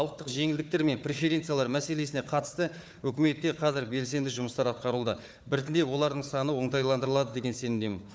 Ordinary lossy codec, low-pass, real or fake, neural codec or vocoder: none; none; fake; codec, 16 kHz, 4 kbps, FunCodec, trained on Chinese and English, 50 frames a second